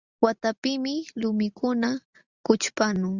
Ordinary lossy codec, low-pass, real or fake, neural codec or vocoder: Opus, 64 kbps; 7.2 kHz; real; none